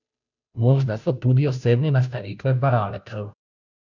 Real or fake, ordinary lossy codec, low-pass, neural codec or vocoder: fake; none; 7.2 kHz; codec, 16 kHz, 0.5 kbps, FunCodec, trained on Chinese and English, 25 frames a second